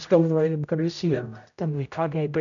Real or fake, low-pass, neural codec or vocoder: fake; 7.2 kHz; codec, 16 kHz, 0.5 kbps, X-Codec, HuBERT features, trained on general audio